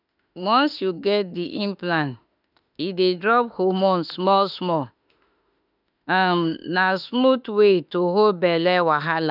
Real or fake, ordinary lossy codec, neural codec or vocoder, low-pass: fake; none; autoencoder, 48 kHz, 32 numbers a frame, DAC-VAE, trained on Japanese speech; 5.4 kHz